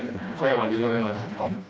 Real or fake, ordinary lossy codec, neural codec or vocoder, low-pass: fake; none; codec, 16 kHz, 1 kbps, FreqCodec, smaller model; none